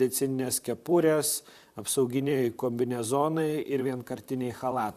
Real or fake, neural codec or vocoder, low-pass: fake; vocoder, 44.1 kHz, 128 mel bands, Pupu-Vocoder; 14.4 kHz